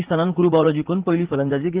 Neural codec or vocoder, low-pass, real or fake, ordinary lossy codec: vocoder, 44.1 kHz, 80 mel bands, Vocos; 3.6 kHz; fake; Opus, 16 kbps